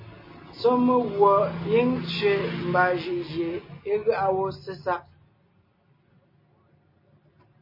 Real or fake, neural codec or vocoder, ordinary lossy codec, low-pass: real; none; MP3, 24 kbps; 5.4 kHz